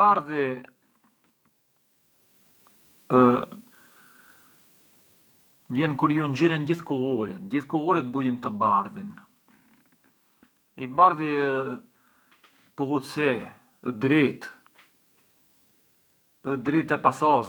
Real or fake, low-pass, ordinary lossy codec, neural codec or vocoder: fake; none; none; codec, 44.1 kHz, 2.6 kbps, SNAC